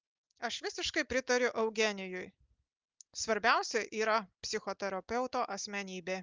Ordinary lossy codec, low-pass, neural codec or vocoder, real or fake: Opus, 32 kbps; 7.2 kHz; none; real